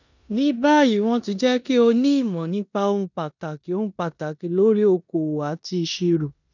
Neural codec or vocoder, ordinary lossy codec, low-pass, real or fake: codec, 16 kHz in and 24 kHz out, 0.9 kbps, LongCat-Audio-Codec, four codebook decoder; none; 7.2 kHz; fake